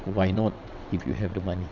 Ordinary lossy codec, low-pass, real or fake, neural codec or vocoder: none; 7.2 kHz; fake; vocoder, 22.05 kHz, 80 mel bands, WaveNeXt